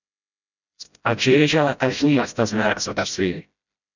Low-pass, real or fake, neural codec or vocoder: 7.2 kHz; fake; codec, 16 kHz, 0.5 kbps, FreqCodec, smaller model